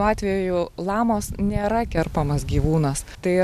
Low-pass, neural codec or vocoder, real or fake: 14.4 kHz; none; real